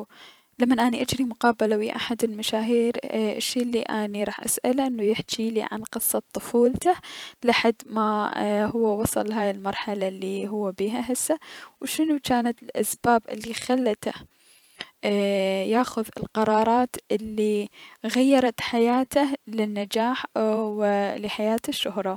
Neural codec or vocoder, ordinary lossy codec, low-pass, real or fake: vocoder, 44.1 kHz, 128 mel bands every 512 samples, BigVGAN v2; none; 19.8 kHz; fake